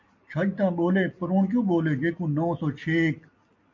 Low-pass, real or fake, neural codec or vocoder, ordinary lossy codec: 7.2 kHz; real; none; MP3, 48 kbps